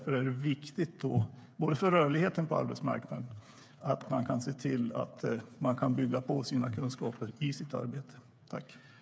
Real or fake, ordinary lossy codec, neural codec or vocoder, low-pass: fake; none; codec, 16 kHz, 8 kbps, FreqCodec, smaller model; none